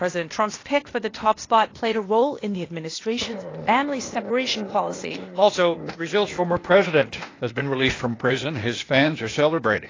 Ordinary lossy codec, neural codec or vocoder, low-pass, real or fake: AAC, 32 kbps; codec, 16 kHz, 0.8 kbps, ZipCodec; 7.2 kHz; fake